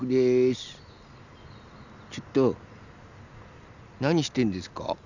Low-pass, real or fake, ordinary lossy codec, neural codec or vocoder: 7.2 kHz; real; none; none